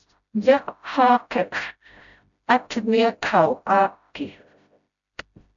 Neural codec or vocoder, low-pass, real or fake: codec, 16 kHz, 0.5 kbps, FreqCodec, smaller model; 7.2 kHz; fake